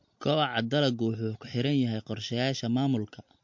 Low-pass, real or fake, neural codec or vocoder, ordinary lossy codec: 7.2 kHz; real; none; MP3, 48 kbps